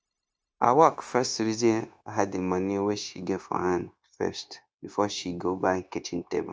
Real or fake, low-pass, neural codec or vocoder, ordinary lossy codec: fake; none; codec, 16 kHz, 0.9 kbps, LongCat-Audio-Codec; none